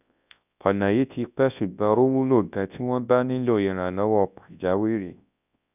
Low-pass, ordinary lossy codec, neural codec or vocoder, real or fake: 3.6 kHz; none; codec, 24 kHz, 0.9 kbps, WavTokenizer, large speech release; fake